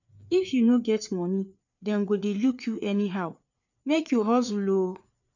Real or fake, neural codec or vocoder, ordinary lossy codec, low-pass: fake; codec, 16 kHz, 4 kbps, FreqCodec, larger model; none; 7.2 kHz